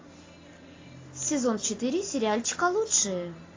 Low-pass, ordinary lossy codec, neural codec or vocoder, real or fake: 7.2 kHz; AAC, 32 kbps; none; real